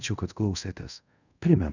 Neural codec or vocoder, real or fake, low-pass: codec, 24 kHz, 0.5 kbps, DualCodec; fake; 7.2 kHz